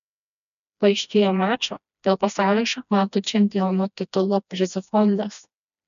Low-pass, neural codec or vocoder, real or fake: 7.2 kHz; codec, 16 kHz, 1 kbps, FreqCodec, smaller model; fake